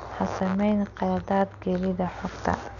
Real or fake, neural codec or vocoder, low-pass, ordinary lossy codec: real; none; 7.2 kHz; none